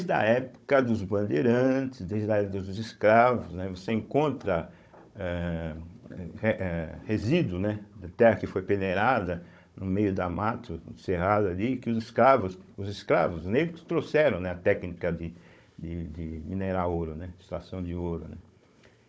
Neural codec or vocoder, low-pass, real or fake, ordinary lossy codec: codec, 16 kHz, 16 kbps, FunCodec, trained on Chinese and English, 50 frames a second; none; fake; none